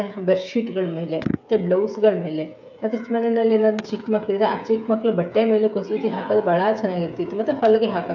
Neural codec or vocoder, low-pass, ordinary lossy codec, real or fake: codec, 16 kHz, 8 kbps, FreqCodec, smaller model; 7.2 kHz; none; fake